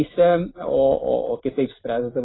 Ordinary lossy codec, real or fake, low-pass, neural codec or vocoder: AAC, 16 kbps; real; 7.2 kHz; none